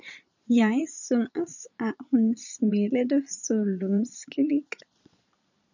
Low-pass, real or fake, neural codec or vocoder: 7.2 kHz; fake; vocoder, 22.05 kHz, 80 mel bands, Vocos